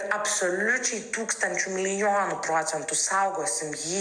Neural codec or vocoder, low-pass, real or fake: none; 9.9 kHz; real